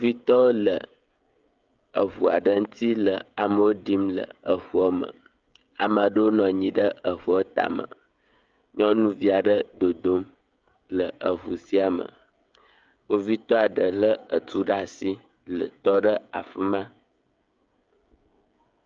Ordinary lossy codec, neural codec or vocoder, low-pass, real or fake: Opus, 24 kbps; codec, 16 kHz, 16 kbps, FreqCodec, smaller model; 7.2 kHz; fake